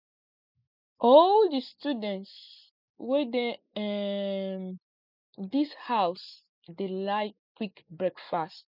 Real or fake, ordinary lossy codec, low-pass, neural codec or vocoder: real; none; 5.4 kHz; none